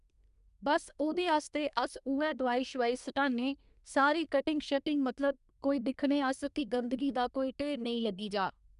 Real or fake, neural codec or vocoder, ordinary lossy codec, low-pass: fake; codec, 24 kHz, 1 kbps, SNAC; none; 10.8 kHz